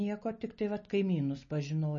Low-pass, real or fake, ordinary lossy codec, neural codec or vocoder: 7.2 kHz; real; MP3, 32 kbps; none